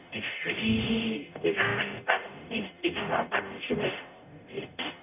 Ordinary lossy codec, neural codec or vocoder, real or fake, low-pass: none; codec, 44.1 kHz, 0.9 kbps, DAC; fake; 3.6 kHz